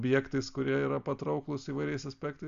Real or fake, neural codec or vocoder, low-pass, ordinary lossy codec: real; none; 7.2 kHz; Opus, 64 kbps